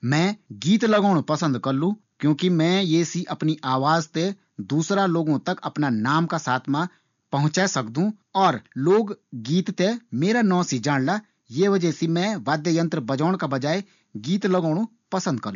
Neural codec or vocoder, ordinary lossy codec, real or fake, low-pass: none; none; real; 7.2 kHz